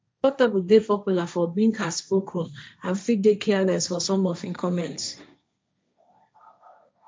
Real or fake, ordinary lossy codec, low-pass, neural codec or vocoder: fake; none; none; codec, 16 kHz, 1.1 kbps, Voila-Tokenizer